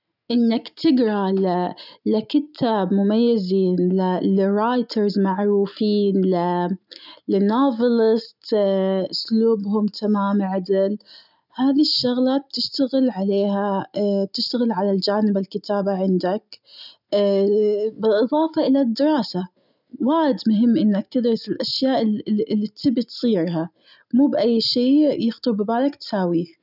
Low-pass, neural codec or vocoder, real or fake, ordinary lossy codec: 5.4 kHz; none; real; none